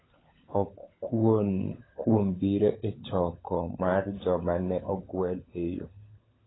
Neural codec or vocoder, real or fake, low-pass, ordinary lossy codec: codec, 16 kHz, 16 kbps, FunCodec, trained on LibriTTS, 50 frames a second; fake; 7.2 kHz; AAC, 16 kbps